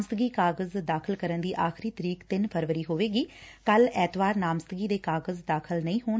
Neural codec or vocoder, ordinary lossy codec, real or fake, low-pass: none; none; real; none